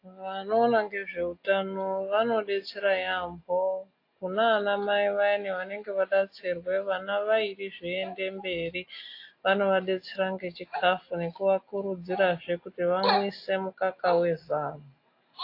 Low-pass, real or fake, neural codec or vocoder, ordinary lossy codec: 5.4 kHz; real; none; AAC, 32 kbps